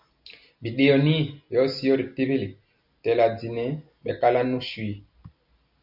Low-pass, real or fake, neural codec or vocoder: 5.4 kHz; real; none